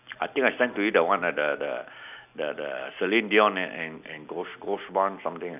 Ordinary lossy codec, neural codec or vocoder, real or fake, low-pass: none; none; real; 3.6 kHz